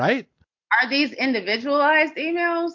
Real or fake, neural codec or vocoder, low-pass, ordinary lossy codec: real; none; 7.2 kHz; MP3, 48 kbps